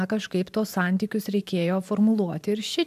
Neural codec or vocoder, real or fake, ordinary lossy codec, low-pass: vocoder, 44.1 kHz, 128 mel bands every 512 samples, BigVGAN v2; fake; AAC, 96 kbps; 14.4 kHz